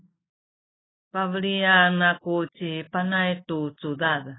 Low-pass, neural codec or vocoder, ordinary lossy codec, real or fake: 7.2 kHz; none; AAC, 16 kbps; real